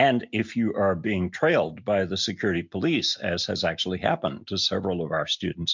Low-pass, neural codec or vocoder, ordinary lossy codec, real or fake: 7.2 kHz; none; MP3, 64 kbps; real